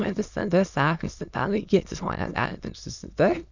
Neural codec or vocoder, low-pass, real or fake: autoencoder, 22.05 kHz, a latent of 192 numbers a frame, VITS, trained on many speakers; 7.2 kHz; fake